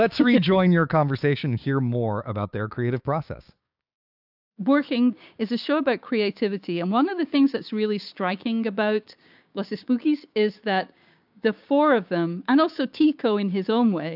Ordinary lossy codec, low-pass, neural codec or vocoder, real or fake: AAC, 48 kbps; 5.4 kHz; codec, 16 kHz, 6 kbps, DAC; fake